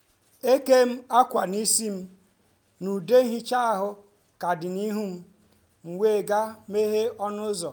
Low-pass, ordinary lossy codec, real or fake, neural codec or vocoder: none; none; real; none